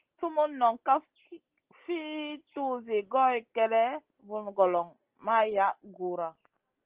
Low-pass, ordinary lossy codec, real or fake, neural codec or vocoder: 3.6 kHz; Opus, 16 kbps; real; none